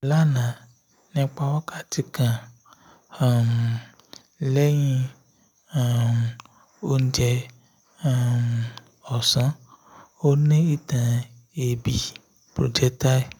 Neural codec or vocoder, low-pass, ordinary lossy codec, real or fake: none; none; none; real